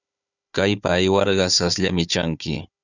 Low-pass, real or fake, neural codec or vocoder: 7.2 kHz; fake; codec, 16 kHz, 4 kbps, FunCodec, trained on Chinese and English, 50 frames a second